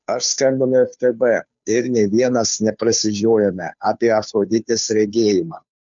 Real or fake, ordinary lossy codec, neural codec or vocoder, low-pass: fake; MP3, 64 kbps; codec, 16 kHz, 2 kbps, FunCodec, trained on Chinese and English, 25 frames a second; 7.2 kHz